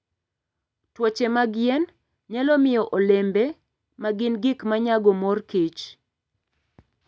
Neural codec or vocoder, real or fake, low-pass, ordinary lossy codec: none; real; none; none